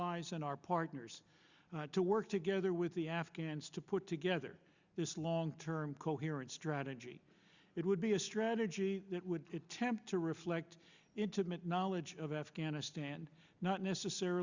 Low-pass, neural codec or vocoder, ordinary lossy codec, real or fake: 7.2 kHz; none; Opus, 64 kbps; real